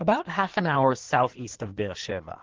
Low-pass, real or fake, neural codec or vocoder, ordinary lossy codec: 7.2 kHz; fake; codec, 16 kHz in and 24 kHz out, 1.1 kbps, FireRedTTS-2 codec; Opus, 16 kbps